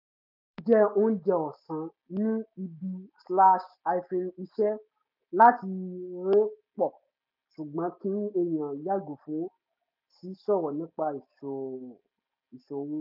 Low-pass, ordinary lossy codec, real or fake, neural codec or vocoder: 5.4 kHz; none; real; none